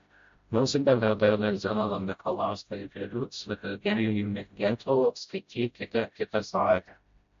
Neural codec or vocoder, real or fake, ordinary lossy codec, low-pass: codec, 16 kHz, 0.5 kbps, FreqCodec, smaller model; fake; MP3, 48 kbps; 7.2 kHz